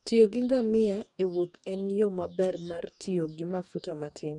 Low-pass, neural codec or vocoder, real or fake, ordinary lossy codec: 10.8 kHz; codec, 44.1 kHz, 2.6 kbps, DAC; fake; none